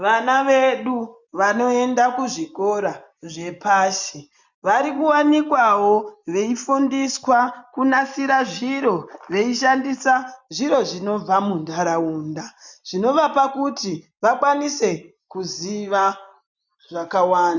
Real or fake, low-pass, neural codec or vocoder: real; 7.2 kHz; none